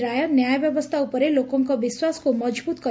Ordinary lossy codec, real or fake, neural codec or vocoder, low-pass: none; real; none; none